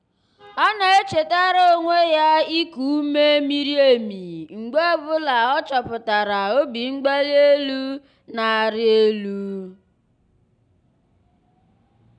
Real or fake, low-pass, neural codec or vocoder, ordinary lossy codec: real; 9.9 kHz; none; none